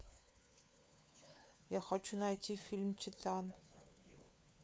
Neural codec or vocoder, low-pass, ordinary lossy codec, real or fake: codec, 16 kHz, 4 kbps, FunCodec, trained on LibriTTS, 50 frames a second; none; none; fake